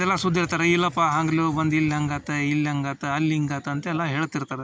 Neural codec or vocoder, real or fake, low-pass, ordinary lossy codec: none; real; none; none